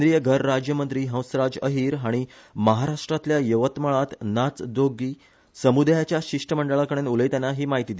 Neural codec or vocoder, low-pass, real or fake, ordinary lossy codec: none; none; real; none